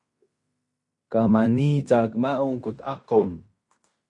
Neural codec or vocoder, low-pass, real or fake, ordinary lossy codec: codec, 16 kHz in and 24 kHz out, 0.9 kbps, LongCat-Audio-Codec, fine tuned four codebook decoder; 10.8 kHz; fake; MP3, 48 kbps